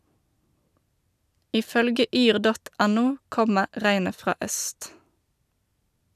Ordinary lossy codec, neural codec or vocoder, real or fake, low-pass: none; none; real; 14.4 kHz